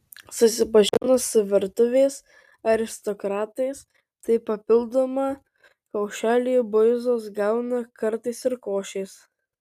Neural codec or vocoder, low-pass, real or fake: none; 14.4 kHz; real